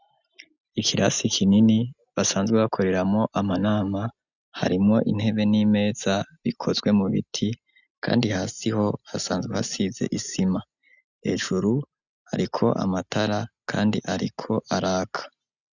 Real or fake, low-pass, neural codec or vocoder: real; 7.2 kHz; none